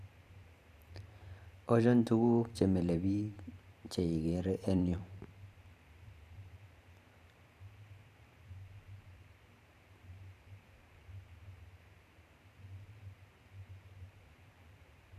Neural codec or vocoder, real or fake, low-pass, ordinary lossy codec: vocoder, 44.1 kHz, 128 mel bands every 512 samples, BigVGAN v2; fake; 14.4 kHz; none